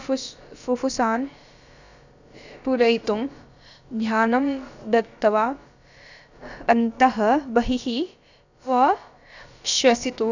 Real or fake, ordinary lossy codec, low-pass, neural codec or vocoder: fake; none; 7.2 kHz; codec, 16 kHz, about 1 kbps, DyCAST, with the encoder's durations